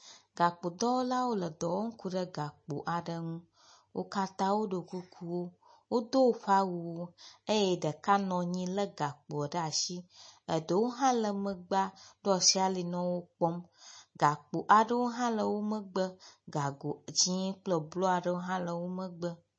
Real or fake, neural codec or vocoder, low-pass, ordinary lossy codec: real; none; 9.9 kHz; MP3, 32 kbps